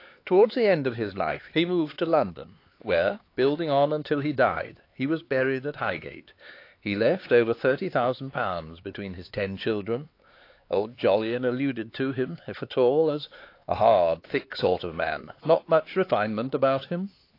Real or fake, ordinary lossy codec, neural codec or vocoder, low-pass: fake; AAC, 32 kbps; codec, 16 kHz, 4 kbps, X-Codec, HuBERT features, trained on LibriSpeech; 5.4 kHz